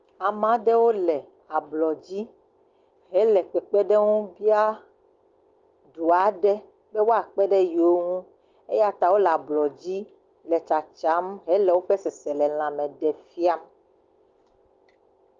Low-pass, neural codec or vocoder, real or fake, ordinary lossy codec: 7.2 kHz; none; real; Opus, 32 kbps